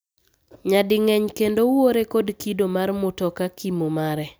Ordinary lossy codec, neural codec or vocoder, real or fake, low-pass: none; none; real; none